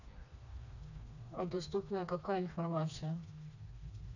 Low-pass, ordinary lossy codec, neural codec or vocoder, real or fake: 7.2 kHz; none; codec, 16 kHz, 2 kbps, FreqCodec, smaller model; fake